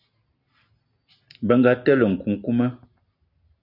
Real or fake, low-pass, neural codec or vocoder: real; 5.4 kHz; none